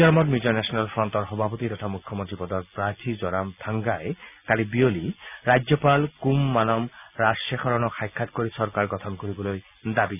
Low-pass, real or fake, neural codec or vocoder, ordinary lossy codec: 3.6 kHz; real; none; none